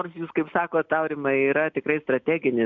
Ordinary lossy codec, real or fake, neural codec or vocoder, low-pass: MP3, 64 kbps; real; none; 7.2 kHz